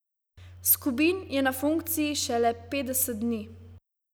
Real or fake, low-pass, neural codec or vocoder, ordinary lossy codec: real; none; none; none